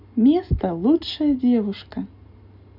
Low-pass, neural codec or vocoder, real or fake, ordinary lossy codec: 5.4 kHz; none; real; none